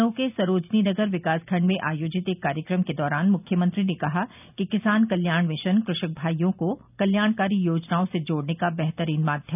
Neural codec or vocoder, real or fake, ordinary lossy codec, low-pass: none; real; none; 3.6 kHz